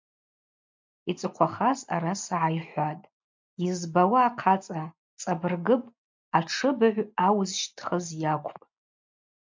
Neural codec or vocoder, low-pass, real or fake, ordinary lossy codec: none; 7.2 kHz; real; MP3, 64 kbps